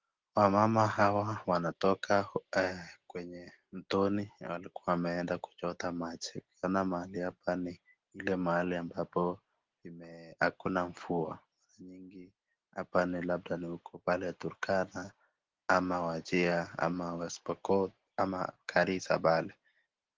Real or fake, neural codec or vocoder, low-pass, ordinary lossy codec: real; none; 7.2 kHz; Opus, 16 kbps